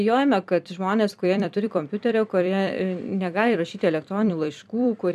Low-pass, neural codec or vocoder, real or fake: 14.4 kHz; vocoder, 44.1 kHz, 128 mel bands every 256 samples, BigVGAN v2; fake